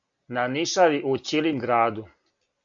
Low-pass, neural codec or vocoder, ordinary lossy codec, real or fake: 7.2 kHz; none; AAC, 64 kbps; real